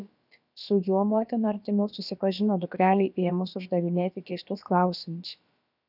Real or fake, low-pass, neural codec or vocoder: fake; 5.4 kHz; codec, 16 kHz, about 1 kbps, DyCAST, with the encoder's durations